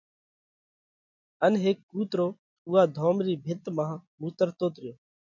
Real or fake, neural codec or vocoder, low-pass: real; none; 7.2 kHz